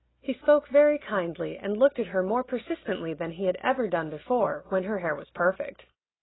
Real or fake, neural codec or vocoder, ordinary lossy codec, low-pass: real; none; AAC, 16 kbps; 7.2 kHz